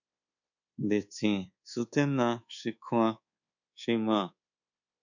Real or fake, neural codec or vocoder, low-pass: fake; codec, 24 kHz, 1.2 kbps, DualCodec; 7.2 kHz